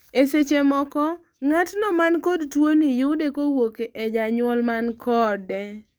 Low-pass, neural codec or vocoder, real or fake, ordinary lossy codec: none; codec, 44.1 kHz, 7.8 kbps, DAC; fake; none